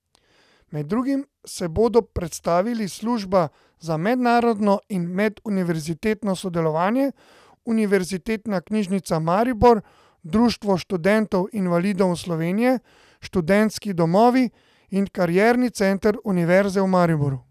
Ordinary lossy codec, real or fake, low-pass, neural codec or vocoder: none; real; 14.4 kHz; none